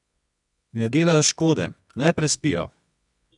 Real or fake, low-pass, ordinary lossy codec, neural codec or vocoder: fake; 10.8 kHz; none; codec, 24 kHz, 0.9 kbps, WavTokenizer, medium music audio release